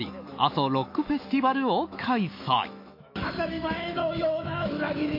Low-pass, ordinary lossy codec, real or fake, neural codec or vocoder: 5.4 kHz; MP3, 32 kbps; fake; autoencoder, 48 kHz, 128 numbers a frame, DAC-VAE, trained on Japanese speech